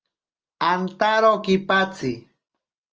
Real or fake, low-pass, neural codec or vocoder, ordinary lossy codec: real; 7.2 kHz; none; Opus, 24 kbps